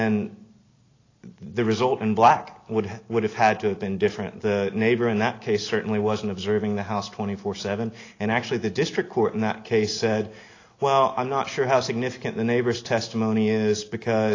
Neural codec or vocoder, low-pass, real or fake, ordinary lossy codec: none; 7.2 kHz; real; AAC, 32 kbps